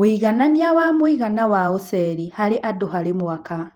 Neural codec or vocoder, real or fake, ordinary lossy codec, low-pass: vocoder, 48 kHz, 128 mel bands, Vocos; fake; Opus, 24 kbps; 19.8 kHz